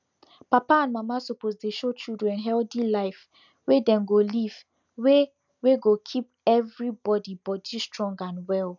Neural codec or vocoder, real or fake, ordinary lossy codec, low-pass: none; real; none; 7.2 kHz